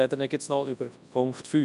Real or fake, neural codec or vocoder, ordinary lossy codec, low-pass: fake; codec, 24 kHz, 0.9 kbps, WavTokenizer, large speech release; none; 10.8 kHz